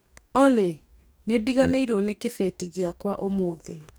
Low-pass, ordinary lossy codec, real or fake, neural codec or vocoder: none; none; fake; codec, 44.1 kHz, 2.6 kbps, DAC